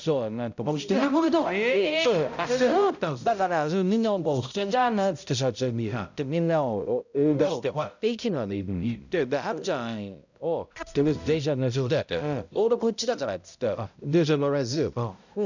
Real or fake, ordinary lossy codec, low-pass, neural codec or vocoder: fake; none; 7.2 kHz; codec, 16 kHz, 0.5 kbps, X-Codec, HuBERT features, trained on balanced general audio